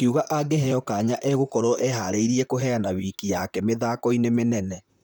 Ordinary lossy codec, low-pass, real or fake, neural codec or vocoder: none; none; fake; vocoder, 44.1 kHz, 128 mel bands, Pupu-Vocoder